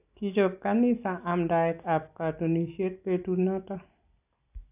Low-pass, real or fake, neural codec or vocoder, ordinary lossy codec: 3.6 kHz; real; none; AAC, 32 kbps